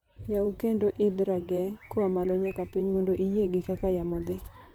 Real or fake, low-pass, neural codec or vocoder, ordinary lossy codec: fake; none; vocoder, 44.1 kHz, 128 mel bands, Pupu-Vocoder; none